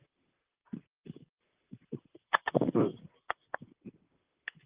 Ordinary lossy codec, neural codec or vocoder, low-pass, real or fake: Opus, 24 kbps; vocoder, 44.1 kHz, 128 mel bands, Pupu-Vocoder; 3.6 kHz; fake